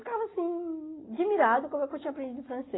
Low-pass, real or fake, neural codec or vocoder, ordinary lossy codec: 7.2 kHz; real; none; AAC, 16 kbps